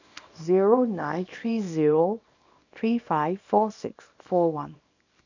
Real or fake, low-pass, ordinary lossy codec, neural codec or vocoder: fake; 7.2 kHz; none; codec, 24 kHz, 0.9 kbps, WavTokenizer, small release